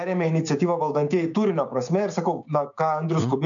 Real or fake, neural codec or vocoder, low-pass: fake; codec, 16 kHz, 6 kbps, DAC; 7.2 kHz